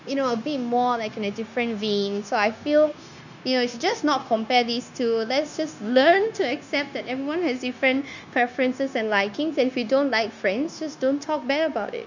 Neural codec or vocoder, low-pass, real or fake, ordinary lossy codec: codec, 16 kHz, 0.9 kbps, LongCat-Audio-Codec; 7.2 kHz; fake; none